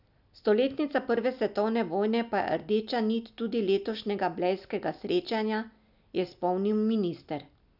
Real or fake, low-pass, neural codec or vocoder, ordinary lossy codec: real; 5.4 kHz; none; none